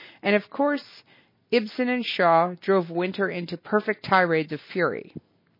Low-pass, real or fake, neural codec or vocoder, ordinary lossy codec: 5.4 kHz; real; none; MP3, 24 kbps